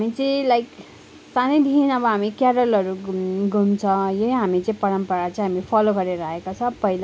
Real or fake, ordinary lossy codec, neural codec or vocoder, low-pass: real; none; none; none